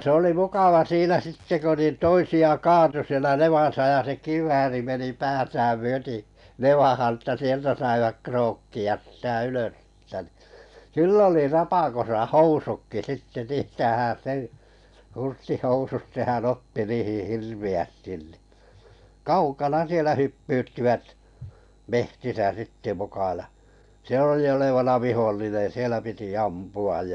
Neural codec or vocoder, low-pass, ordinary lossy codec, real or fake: none; 10.8 kHz; none; real